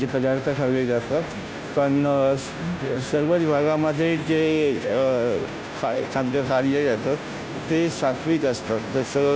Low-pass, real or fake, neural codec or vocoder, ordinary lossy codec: none; fake; codec, 16 kHz, 0.5 kbps, FunCodec, trained on Chinese and English, 25 frames a second; none